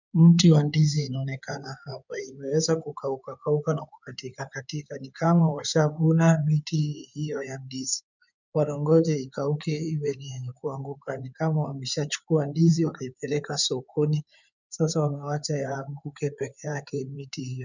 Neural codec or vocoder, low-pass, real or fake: codec, 16 kHz in and 24 kHz out, 2.2 kbps, FireRedTTS-2 codec; 7.2 kHz; fake